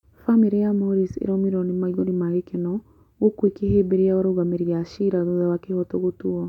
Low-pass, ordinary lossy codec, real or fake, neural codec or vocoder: 19.8 kHz; none; real; none